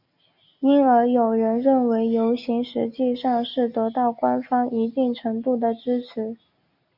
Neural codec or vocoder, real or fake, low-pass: none; real; 5.4 kHz